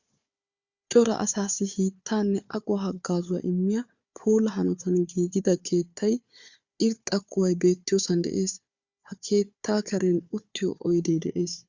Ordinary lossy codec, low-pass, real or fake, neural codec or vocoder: Opus, 64 kbps; 7.2 kHz; fake; codec, 16 kHz, 4 kbps, FunCodec, trained on Chinese and English, 50 frames a second